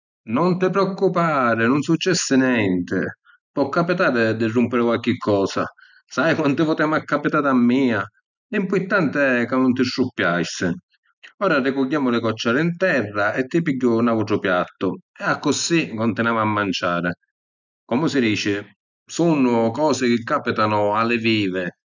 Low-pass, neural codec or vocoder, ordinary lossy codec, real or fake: 7.2 kHz; none; none; real